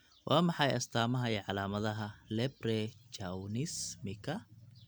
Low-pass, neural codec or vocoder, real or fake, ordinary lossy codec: none; none; real; none